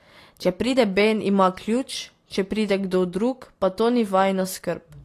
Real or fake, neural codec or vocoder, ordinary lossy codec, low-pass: real; none; AAC, 48 kbps; 14.4 kHz